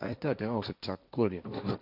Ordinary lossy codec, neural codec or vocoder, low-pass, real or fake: none; codec, 16 kHz, 1.1 kbps, Voila-Tokenizer; 5.4 kHz; fake